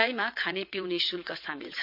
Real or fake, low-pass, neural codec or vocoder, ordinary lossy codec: fake; 5.4 kHz; vocoder, 22.05 kHz, 80 mel bands, WaveNeXt; MP3, 48 kbps